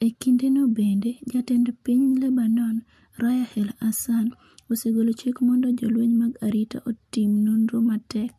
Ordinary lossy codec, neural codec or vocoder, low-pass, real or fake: MP3, 64 kbps; none; 14.4 kHz; real